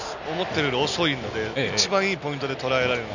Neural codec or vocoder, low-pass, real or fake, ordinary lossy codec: none; 7.2 kHz; real; none